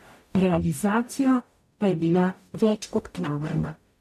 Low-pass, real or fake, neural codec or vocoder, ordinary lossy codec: 14.4 kHz; fake; codec, 44.1 kHz, 0.9 kbps, DAC; none